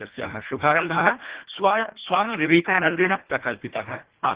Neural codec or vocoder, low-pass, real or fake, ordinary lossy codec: codec, 24 kHz, 1.5 kbps, HILCodec; 3.6 kHz; fake; Opus, 16 kbps